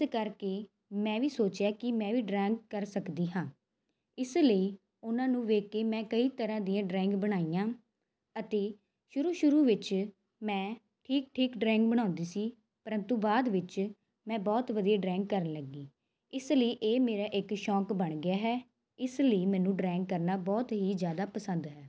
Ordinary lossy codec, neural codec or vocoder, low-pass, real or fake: none; none; none; real